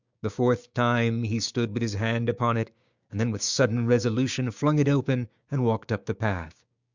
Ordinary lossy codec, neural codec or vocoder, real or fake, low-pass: Opus, 64 kbps; codec, 16 kHz, 6 kbps, DAC; fake; 7.2 kHz